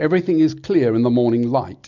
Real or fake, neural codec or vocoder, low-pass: real; none; 7.2 kHz